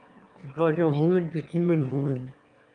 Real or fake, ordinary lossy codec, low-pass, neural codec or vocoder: fake; Opus, 32 kbps; 9.9 kHz; autoencoder, 22.05 kHz, a latent of 192 numbers a frame, VITS, trained on one speaker